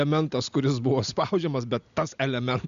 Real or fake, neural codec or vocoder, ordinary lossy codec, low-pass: real; none; Opus, 64 kbps; 7.2 kHz